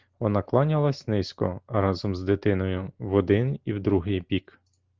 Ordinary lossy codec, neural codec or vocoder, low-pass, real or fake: Opus, 16 kbps; none; 7.2 kHz; real